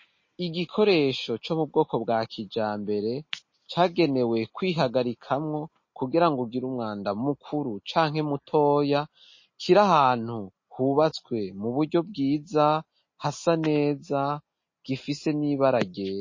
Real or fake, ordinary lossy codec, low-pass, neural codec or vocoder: real; MP3, 32 kbps; 7.2 kHz; none